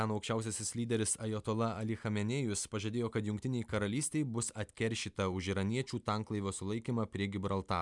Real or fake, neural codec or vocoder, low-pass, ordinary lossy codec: real; none; 10.8 kHz; MP3, 96 kbps